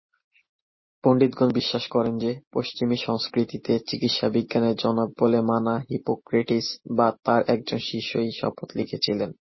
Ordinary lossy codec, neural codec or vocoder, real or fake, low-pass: MP3, 24 kbps; none; real; 7.2 kHz